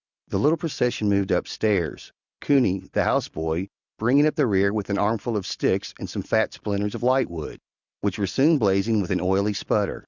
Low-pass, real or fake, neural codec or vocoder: 7.2 kHz; real; none